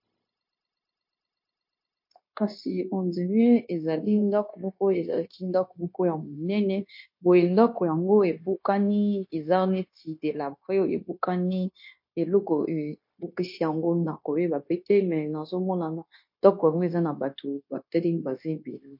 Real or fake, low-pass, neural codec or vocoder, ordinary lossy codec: fake; 5.4 kHz; codec, 16 kHz, 0.9 kbps, LongCat-Audio-Codec; MP3, 32 kbps